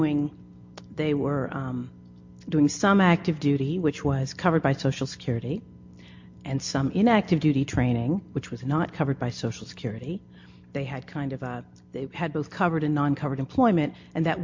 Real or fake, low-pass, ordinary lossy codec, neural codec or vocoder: real; 7.2 kHz; MP3, 48 kbps; none